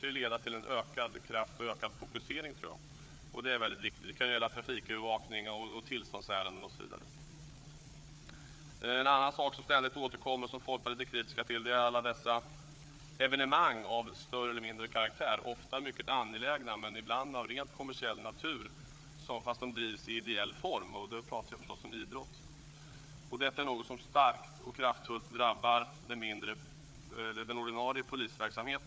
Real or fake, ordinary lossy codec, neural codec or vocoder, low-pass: fake; none; codec, 16 kHz, 4 kbps, FreqCodec, larger model; none